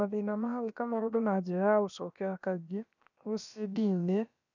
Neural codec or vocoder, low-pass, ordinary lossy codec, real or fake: codec, 16 kHz, about 1 kbps, DyCAST, with the encoder's durations; 7.2 kHz; none; fake